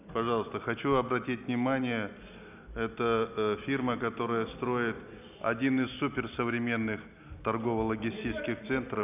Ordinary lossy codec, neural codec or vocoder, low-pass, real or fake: none; none; 3.6 kHz; real